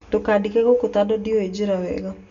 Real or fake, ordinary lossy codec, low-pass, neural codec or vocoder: real; none; 7.2 kHz; none